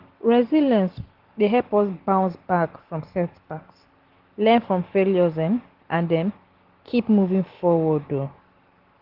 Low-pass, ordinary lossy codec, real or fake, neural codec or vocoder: 5.4 kHz; Opus, 16 kbps; real; none